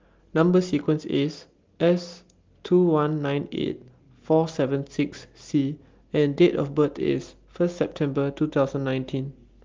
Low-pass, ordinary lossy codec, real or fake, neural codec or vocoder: 7.2 kHz; Opus, 32 kbps; real; none